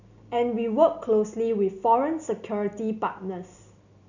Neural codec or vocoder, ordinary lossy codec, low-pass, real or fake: none; none; 7.2 kHz; real